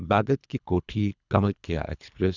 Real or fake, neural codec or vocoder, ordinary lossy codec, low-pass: fake; codec, 24 kHz, 3 kbps, HILCodec; none; 7.2 kHz